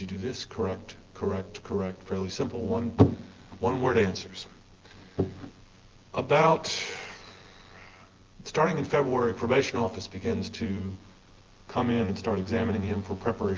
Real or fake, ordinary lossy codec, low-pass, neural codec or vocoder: fake; Opus, 16 kbps; 7.2 kHz; vocoder, 24 kHz, 100 mel bands, Vocos